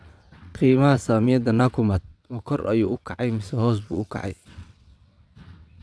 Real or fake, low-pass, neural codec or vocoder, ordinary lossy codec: fake; none; vocoder, 22.05 kHz, 80 mel bands, WaveNeXt; none